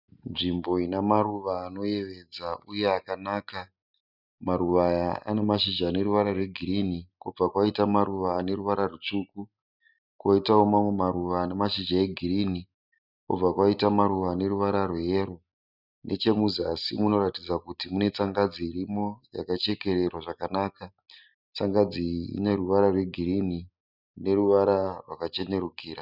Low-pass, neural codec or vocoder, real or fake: 5.4 kHz; none; real